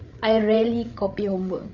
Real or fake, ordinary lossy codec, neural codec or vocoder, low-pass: fake; none; codec, 16 kHz, 8 kbps, FreqCodec, larger model; 7.2 kHz